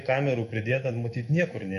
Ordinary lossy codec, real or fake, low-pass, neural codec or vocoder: MP3, 48 kbps; fake; 14.4 kHz; autoencoder, 48 kHz, 128 numbers a frame, DAC-VAE, trained on Japanese speech